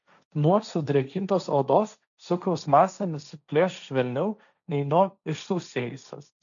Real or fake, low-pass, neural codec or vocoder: fake; 7.2 kHz; codec, 16 kHz, 1.1 kbps, Voila-Tokenizer